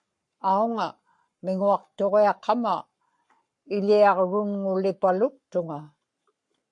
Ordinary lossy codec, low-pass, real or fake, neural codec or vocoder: MP3, 48 kbps; 10.8 kHz; fake; codec, 44.1 kHz, 7.8 kbps, Pupu-Codec